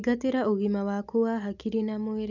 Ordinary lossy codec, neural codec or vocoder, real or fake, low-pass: none; none; real; 7.2 kHz